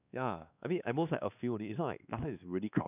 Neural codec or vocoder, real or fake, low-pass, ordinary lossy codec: codec, 16 kHz, 2 kbps, X-Codec, WavLM features, trained on Multilingual LibriSpeech; fake; 3.6 kHz; none